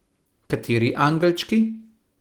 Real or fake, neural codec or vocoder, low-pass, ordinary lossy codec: real; none; 19.8 kHz; Opus, 16 kbps